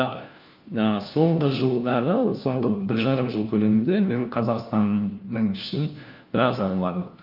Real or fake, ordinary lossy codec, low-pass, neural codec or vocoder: fake; Opus, 24 kbps; 5.4 kHz; codec, 16 kHz, 1 kbps, FunCodec, trained on LibriTTS, 50 frames a second